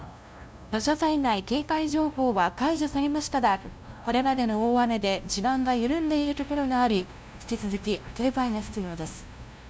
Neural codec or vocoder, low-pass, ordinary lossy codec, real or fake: codec, 16 kHz, 0.5 kbps, FunCodec, trained on LibriTTS, 25 frames a second; none; none; fake